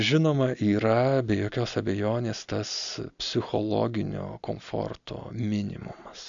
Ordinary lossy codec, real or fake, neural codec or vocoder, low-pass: MP3, 64 kbps; real; none; 7.2 kHz